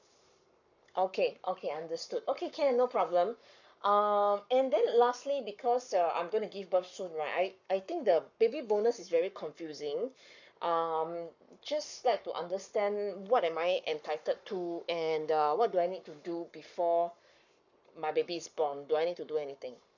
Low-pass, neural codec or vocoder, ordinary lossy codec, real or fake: 7.2 kHz; codec, 44.1 kHz, 7.8 kbps, Pupu-Codec; none; fake